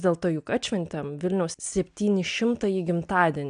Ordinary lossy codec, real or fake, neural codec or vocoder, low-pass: AAC, 96 kbps; real; none; 9.9 kHz